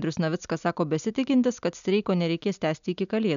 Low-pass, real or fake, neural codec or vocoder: 7.2 kHz; real; none